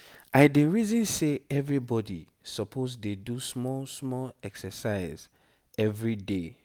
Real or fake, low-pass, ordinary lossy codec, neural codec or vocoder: real; 19.8 kHz; none; none